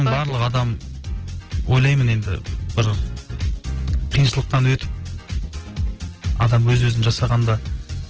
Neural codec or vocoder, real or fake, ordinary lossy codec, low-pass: none; real; Opus, 16 kbps; 7.2 kHz